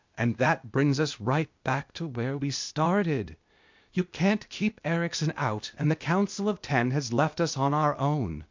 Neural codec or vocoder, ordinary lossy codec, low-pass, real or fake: codec, 16 kHz, 0.8 kbps, ZipCodec; MP3, 64 kbps; 7.2 kHz; fake